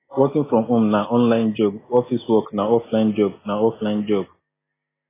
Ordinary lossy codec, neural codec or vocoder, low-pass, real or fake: AAC, 16 kbps; none; 3.6 kHz; real